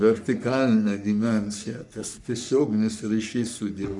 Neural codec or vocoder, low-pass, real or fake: codec, 44.1 kHz, 3.4 kbps, Pupu-Codec; 10.8 kHz; fake